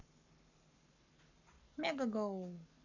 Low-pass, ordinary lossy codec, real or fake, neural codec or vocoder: 7.2 kHz; none; fake; codec, 44.1 kHz, 7.8 kbps, Pupu-Codec